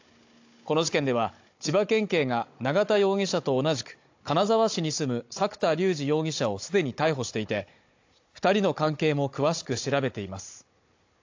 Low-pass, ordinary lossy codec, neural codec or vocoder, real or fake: 7.2 kHz; AAC, 48 kbps; codec, 16 kHz, 16 kbps, FunCodec, trained on Chinese and English, 50 frames a second; fake